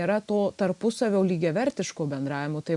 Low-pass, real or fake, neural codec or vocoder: 10.8 kHz; real; none